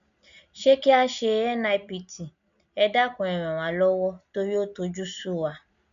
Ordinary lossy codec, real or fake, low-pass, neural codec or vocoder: none; real; 7.2 kHz; none